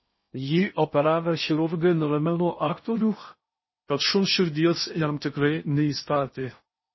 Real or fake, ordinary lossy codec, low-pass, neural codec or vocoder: fake; MP3, 24 kbps; 7.2 kHz; codec, 16 kHz in and 24 kHz out, 0.6 kbps, FocalCodec, streaming, 4096 codes